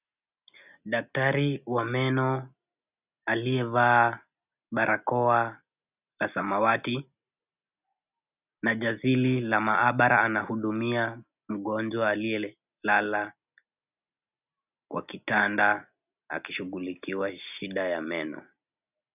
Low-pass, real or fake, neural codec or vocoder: 3.6 kHz; real; none